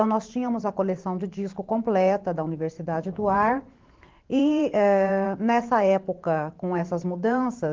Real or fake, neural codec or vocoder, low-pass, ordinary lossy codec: fake; vocoder, 44.1 kHz, 128 mel bands every 512 samples, BigVGAN v2; 7.2 kHz; Opus, 16 kbps